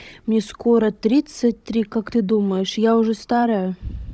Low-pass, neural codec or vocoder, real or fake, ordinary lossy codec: none; codec, 16 kHz, 16 kbps, FunCodec, trained on Chinese and English, 50 frames a second; fake; none